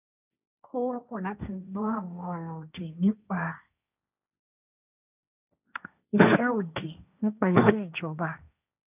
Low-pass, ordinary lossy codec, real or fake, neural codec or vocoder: 3.6 kHz; none; fake; codec, 16 kHz, 1.1 kbps, Voila-Tokenizer